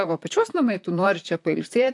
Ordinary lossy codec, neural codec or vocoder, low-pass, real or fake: AAC, 64 kbps; vocoder, 44.1 kHz, 128 mel bands, Pupu-Vocoder; 10.8 kHz; fake